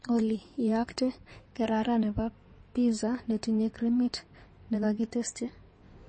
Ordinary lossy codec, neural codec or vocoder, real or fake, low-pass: MP3, 32 kbps; codec, 16 kHz in and 24 kHz out, 2.2 kbps, FireRedTTS-2 codec; fake; 9.9 kHz